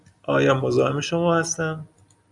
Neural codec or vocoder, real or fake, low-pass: none; real; 10.8 kHz